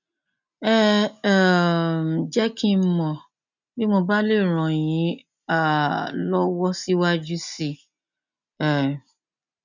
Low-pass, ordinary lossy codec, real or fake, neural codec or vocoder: 7.2 kHz; none; real; none